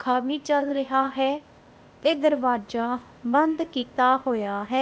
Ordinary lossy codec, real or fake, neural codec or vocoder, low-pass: none; fake; codec, 16 kHz, 0.8 kbps, ZipCodec; none